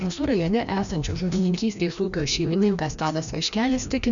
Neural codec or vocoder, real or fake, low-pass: codec, 16 kHz, 1 kbps, FreqCodec, larger model; fake; 7.2 kHz